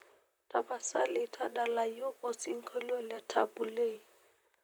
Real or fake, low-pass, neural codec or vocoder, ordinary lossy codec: fake; none; vocoder, 44.1 kHz, 128 mel bands, Pupu-Vocoder; none